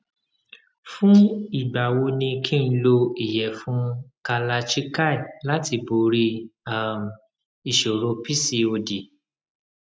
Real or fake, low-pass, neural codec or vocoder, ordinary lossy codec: real; none; none; none